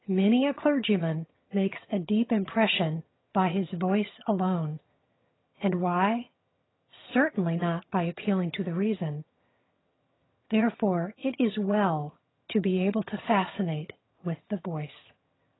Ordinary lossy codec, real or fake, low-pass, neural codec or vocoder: AAC, 16 kbps; fake; 7.2 kHz; vocoder, 22.05 kHz, 80 mel bands, HiFi-GAN